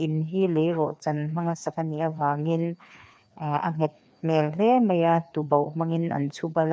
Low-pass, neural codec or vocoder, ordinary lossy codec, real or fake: none; codec, 16 kHz, 2 kbps, FreqCodec, larger model; none; fake